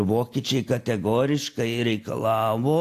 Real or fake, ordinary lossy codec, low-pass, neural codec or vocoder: real; AAC, 64 kbps; 14.4 kHz; none